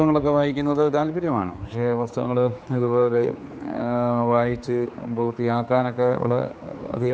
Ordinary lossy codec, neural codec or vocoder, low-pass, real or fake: none; codec, 16 kHz, 4 kbps, X-Codec, HuBERT features, trained on general audio; none; fake